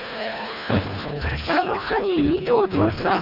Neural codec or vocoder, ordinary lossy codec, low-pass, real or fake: codec, 24 kHz, 1.5 kbps, HILCodec; none; 5.4 kHz; fake